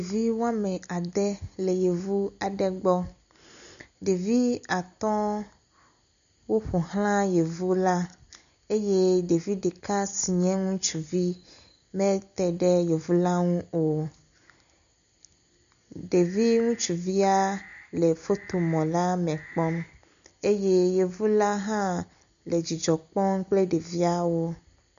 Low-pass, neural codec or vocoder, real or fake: 7.2 kHz; none; real